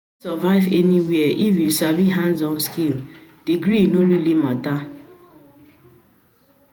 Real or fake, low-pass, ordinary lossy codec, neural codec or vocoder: fake; none; none; vocoder, 48 kHz, 128 mel bands, Vocos